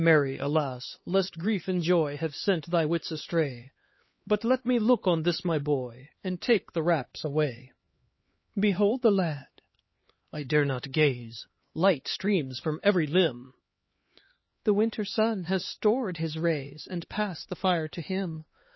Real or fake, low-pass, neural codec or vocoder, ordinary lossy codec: fake; 7.2 kHz; codec, 16 kHz, 4 kbps, X-Codec, HuBERT features, trained on LibriSpeech; MP3, 24 kbps